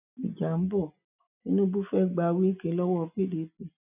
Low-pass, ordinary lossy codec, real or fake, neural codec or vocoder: 3.6 kHz; none; real; none